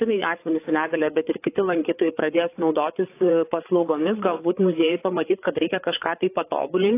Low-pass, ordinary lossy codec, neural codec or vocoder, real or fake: 3.6 kHz; AAC, 24 kbps; codec, 16 kHz, 8 kbps, FreqCodec, larger model; fake